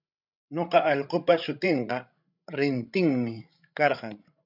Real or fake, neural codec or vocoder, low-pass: fake; codec, 16 kHz, 16 kbps, FreqCodec, larger model; 5.4 kHz